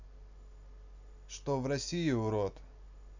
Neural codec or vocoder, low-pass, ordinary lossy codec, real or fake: none; 7.2 kHz; none; real